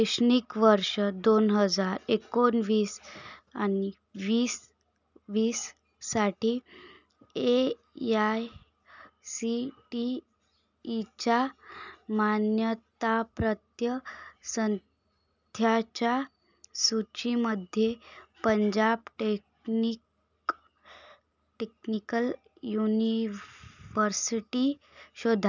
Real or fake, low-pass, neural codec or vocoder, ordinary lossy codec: real; 7.2 kHz; none; none